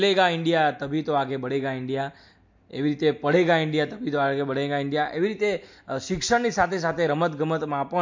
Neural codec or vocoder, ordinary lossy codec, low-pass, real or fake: none; MP3, 48 kbps; 7.2 kHz; real